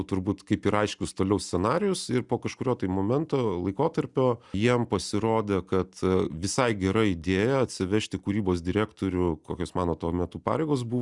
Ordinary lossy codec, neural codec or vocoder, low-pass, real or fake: Opus, 64 kbps; none; 10.8 kHz; real